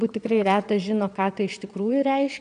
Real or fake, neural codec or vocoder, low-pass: fake; vocoder, 22.05 kHz, 80 mel bands, Vocos; 9.9 kHz